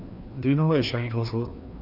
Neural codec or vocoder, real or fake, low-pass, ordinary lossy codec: codec, 16 kHz, 2 kbps, FreqCodec, larger model; fake; 5.4 kHz; none